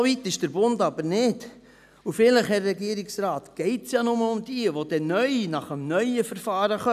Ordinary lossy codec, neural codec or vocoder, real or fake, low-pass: none; none; real; 14.4 kHz